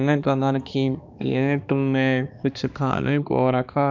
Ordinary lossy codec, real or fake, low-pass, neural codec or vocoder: none; fake; 7.2 kHz; codec, 16 kHz, 2 kbps, X-Codec, HuBERT features, trained on balanced general audio